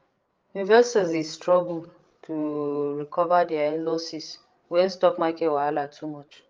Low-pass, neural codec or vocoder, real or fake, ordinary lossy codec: 7.2 kHz; codec, 16 kHz, 8 kbps, FreqCodec, larger model; fake; Opus, 24 kbps